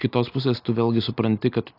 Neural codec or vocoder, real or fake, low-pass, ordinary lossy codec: none; real; 5.4 kHz; AAC, 32 kbps